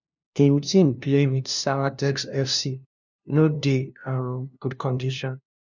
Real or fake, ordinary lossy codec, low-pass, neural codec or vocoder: fake; none; 7.2 kHz; codec, 16 kHz, 0.5 kbps, FunCodec, trained on LibriTTS, 25 frames a second